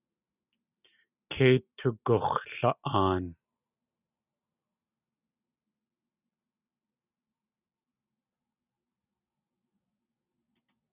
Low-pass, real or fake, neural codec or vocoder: 3.6 kHz; real; none